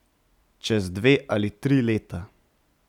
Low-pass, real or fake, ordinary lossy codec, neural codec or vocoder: 19.8 kHz; real; none; none